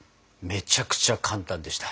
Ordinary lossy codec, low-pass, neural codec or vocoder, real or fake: none; none; none; real